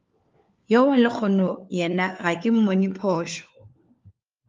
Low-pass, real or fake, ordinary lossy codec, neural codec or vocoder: 7.2 kHz; fake; Opus, 32 kbps; codec, 16 kHz, 4 kbps, FunCodec, trained on LibriTTS, 50 frames a second